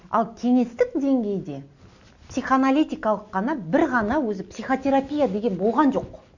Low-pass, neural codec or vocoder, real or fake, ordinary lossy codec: 7.2 kHz; none; real; none